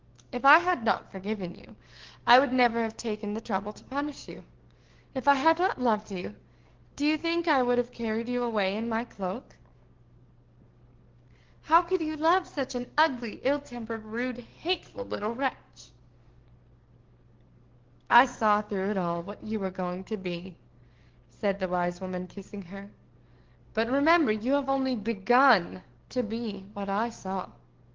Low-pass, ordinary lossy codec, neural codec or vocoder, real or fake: 7.2 kHz; Opus, 16 kbps; codec, 44.1 kHz, 7.8 kbps, DAC; fake